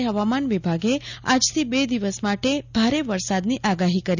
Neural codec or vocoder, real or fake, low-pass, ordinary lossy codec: none; real; 7.2 kHz; none